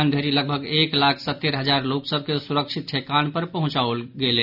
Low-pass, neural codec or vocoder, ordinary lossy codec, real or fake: 5.4 kHz; none; none; real